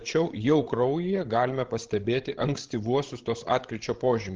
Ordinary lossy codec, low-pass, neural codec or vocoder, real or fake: Opus, 16 kbps; 7.2 kHz; codec, 16 kHz, 16 kbps, FreqCodec, larger model; fake